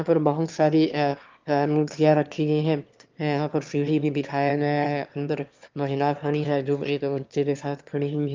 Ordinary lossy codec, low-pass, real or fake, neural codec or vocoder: Opus, 24 kbps; 7.2 kHz; fake; autoencoder, 22.05 kHz, a latent of 192 numbers a frame, VITS, trained on one speaker